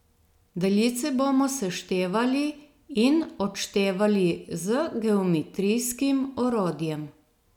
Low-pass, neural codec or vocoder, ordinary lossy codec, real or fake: 19.8 kHz; none; none; real